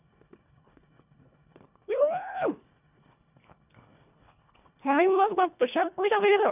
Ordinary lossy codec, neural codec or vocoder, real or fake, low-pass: none; codec, 24 kHz, 1.5 kbps, HILCodec; fake; 3.6 kHz